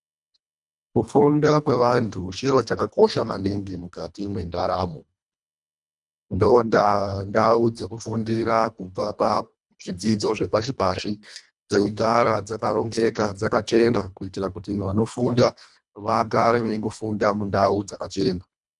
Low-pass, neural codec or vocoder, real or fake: 10.8 kHz; codec, 24 kHz, 1.5 kbps, HILCodec; fake